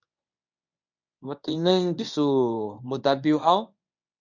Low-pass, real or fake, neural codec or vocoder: 7.2 kHz; fake; codec, 24 kHz, 0.9 kbps, WavTokenizer, medium speech release version 1